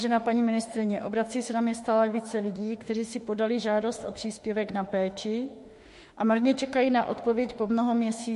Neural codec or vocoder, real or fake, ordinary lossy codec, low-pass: autoencoder, 48 kHz, 32 numbers a frame, DAC-VAE, trained on Japanese speech; fake; MP3, 48 kbps; 14.4 kHz